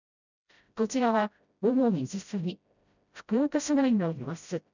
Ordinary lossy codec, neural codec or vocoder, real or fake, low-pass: none; codec, 16 kHz, 0.5 kbps, FreqCodec, smaller model; fake; 7.2 kHz